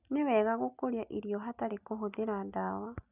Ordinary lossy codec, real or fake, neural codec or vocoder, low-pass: none; real; none; 3.6 kHz